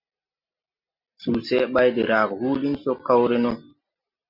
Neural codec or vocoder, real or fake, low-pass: none; real; 5.4 kHz